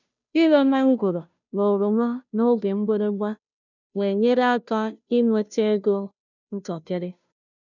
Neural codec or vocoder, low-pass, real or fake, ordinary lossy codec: codec, 16 kHz, 0.5 kbps, FunCodec, trained on Chinese and English, 25 frames a second; 7.2 kHz; fake; none